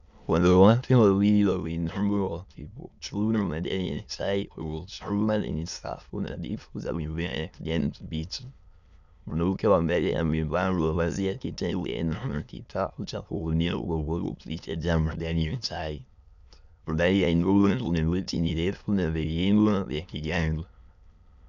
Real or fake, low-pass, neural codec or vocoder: fake; 7.2 kHz; autoencoder, 22.05 kHz, a latent of 192 numbers a frame, VITS, trained on many speakers